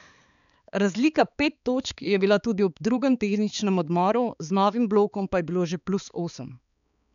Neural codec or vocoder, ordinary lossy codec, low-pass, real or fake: codec, 16 kHz, 4 kbps, X-Codec, HuBERT features, trained on balanced general audio; none; 7.2 kHz; fake